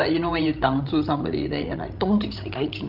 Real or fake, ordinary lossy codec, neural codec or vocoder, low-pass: fake; Opus, 24 kbps; codec, 16 kHz, 16 kbps, FreqCodec, larger model; 5.4 kHz